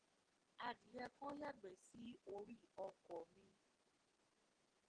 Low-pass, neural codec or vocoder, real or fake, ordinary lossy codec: 9.9 kHz; none; real; Opus, 16 kbps